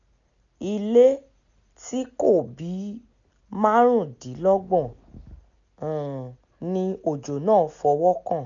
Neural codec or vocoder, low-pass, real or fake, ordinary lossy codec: none; 7.2 kHz; real; none